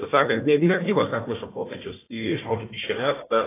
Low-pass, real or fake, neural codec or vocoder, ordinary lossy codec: 3.6 kHz; fake; codec, 16 kHz, 1 kbps, FunCodec, trained on Chinese and English, 50 frames a second; AAC, 16 kbps